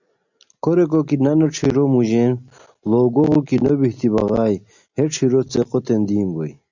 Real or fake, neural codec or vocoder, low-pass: real; none; 7.2 kHz